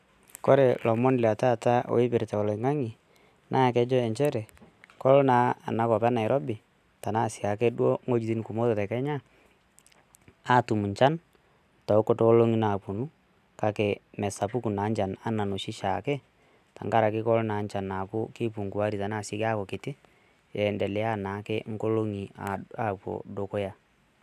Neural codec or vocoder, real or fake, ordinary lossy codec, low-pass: none; real; none; 14.4 kHz